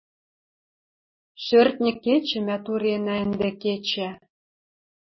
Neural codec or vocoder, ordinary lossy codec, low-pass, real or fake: none; MP3, 24 kbps; 7.2 kHz; real